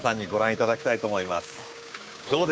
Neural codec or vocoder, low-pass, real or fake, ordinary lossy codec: codec, 16 kHz, 6 kbps, DAC; none; fake; none